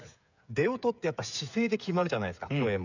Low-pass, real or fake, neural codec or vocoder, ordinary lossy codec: 7.2 kHz; fake; codec, 16 kHz, 8 kbps, FreqCodec, smaller model; none